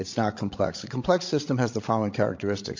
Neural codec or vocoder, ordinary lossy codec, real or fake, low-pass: codec, 16 kHz, 16 kbps, FreqCodec, larger model; MP3, 48 kbps; fake; 7.2 kHz